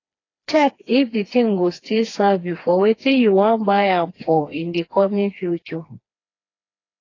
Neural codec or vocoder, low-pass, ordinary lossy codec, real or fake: codec, 16 kHz, 2 kbps, FreqCodec, smaller model; 7.2 kHz; AAC, 32 kbps; fake